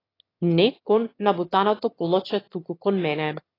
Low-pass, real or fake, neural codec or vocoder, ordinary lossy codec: 5.4 kHz; fake; autoencoder, 22.05 kHz, a latent of 192 numbers a frame, VITS, trained on one speaker; AAC, 24 kbps